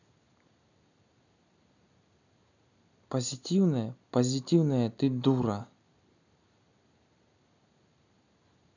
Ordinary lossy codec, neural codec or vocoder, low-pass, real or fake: none; none; 7.2 kHz; real